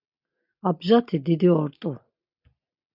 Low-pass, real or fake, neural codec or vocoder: 5.4 kHz; real; none